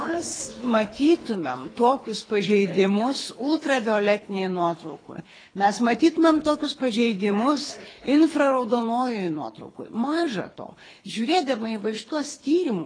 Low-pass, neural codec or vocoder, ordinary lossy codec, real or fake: 9.9 kHz; codec, 24 kHz, 3 kbps, HILCodec; AAC, 32 kbps; fake